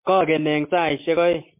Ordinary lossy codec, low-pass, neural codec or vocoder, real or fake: MP3, 32 kbps; 3.6 kHz; none; real